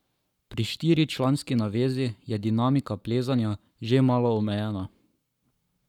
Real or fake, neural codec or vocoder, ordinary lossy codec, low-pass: fake; codec, 44.1 kHz, 7.8 kbps, Pupu-Codec; none; 19.8 kHz